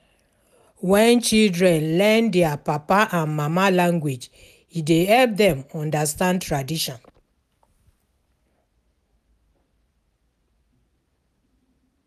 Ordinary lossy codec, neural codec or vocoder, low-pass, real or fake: none; none; 14.4 kHz; real